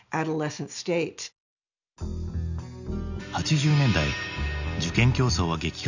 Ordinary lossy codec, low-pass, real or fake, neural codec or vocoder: none; 7.2 kHz; real; none